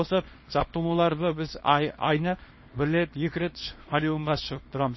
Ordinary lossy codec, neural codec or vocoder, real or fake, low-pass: MP3, 24 kbps; codec, 24 kHz, 0.9 kbps, WavTokenizer, small release; fake; 7.2 kHz